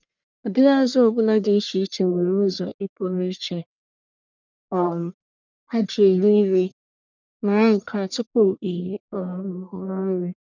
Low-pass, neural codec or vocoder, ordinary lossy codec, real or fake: 7.2 kHz; codec, 44.1 kHz, 1.7 kbps, Pupu-Codec; none; fake